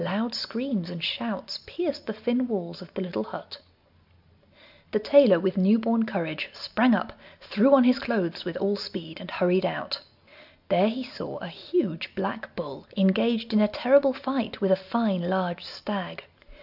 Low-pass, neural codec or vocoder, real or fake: 5.4 kHz; none; real